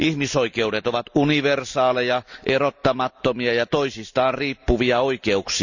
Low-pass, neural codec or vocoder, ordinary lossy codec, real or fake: 7.2 kHz; none; none; real